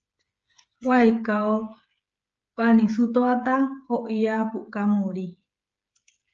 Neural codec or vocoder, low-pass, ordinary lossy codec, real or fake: codec, 16 kHz, 8 kbps, FreqCodec, smaller model; 7.2 kHz; Opus, 24 kbps; fake